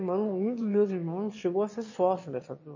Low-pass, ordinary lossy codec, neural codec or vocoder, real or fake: 7.2 kHz; MP3, 32 kbps; autoencoder, 22.05 kHz, a latent of 192 numbers a frame, VITS, trained on one speaker; fake